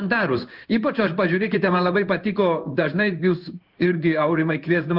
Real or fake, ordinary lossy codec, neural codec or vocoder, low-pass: fake; Opus, 32 kbps; codec, 16 kHz in and 24 kHz out, 1 kbps, XY-Tokenizer; 5.4 kHz